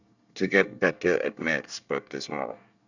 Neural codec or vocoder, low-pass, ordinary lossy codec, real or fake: codec, 24 kHz, 1 kbps, SNAC; 7.2 kHz; none; fake